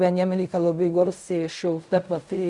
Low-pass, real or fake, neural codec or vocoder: 10.8 kHz; fake; codec, 16 kHz in and 24 kHz out, 0.4 kbps, LongCat-Audio-Codec, fine tuned four codebook decoder